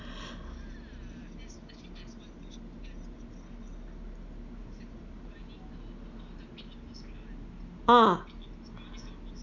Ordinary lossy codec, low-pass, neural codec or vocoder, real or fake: none; 7.2 kHz; none; real